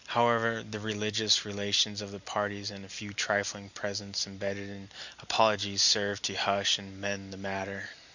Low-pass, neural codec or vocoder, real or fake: 7.2 kHz; none; real